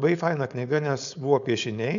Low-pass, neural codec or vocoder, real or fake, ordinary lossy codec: 7.2 kHz; codec, 16 kHz, 4.8 kbps, FACodec; fake; MP3, 96 kbps